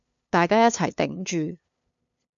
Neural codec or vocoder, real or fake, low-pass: codec, 16 kHz, 2 kbps, FunCodec, trained on LibriTTS, 25 frames a second; fake; 7.2 kHz